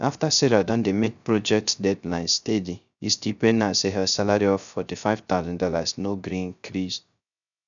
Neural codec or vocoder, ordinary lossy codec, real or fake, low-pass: codec, 16 kHz, 0.3 kbps, FocalCodec; none; fake; 7.2 kHz